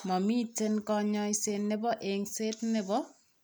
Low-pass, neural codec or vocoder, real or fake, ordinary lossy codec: none; none; real; none